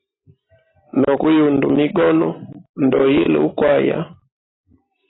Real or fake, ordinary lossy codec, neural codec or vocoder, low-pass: fake; AAC, 16 kbps; vocoder, 44.1 kHz, 128 mel bands every 256 samples, BigVGAN v2; 7.2 kHz